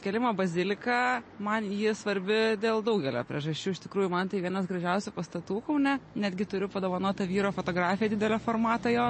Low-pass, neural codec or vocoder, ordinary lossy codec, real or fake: 9.9 kHz; none; MP3, 32 kbps; real